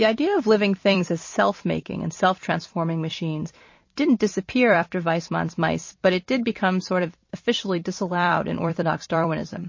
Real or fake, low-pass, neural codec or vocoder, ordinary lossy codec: fake; 7.2 kHz; vocoder, 44.1 kHz, 128 mel bands every 256 samples, BigVGAN v2; MP3, 32 kbps